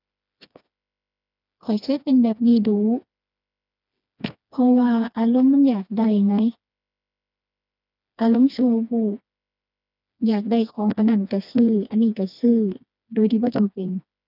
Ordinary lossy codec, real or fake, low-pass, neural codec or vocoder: none; fake; 5.4 kHz; codec, 16 kHz, 2 kbps, FreqCodec, smaller model